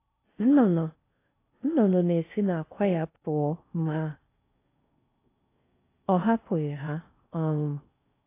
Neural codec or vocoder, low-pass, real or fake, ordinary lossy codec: codec, 16 kHz in and 24 kHz out, 0.6 kbps, FocalCodec, streaming, 4096 codes; 3.6 kHz; fake; AAC, 24 kbps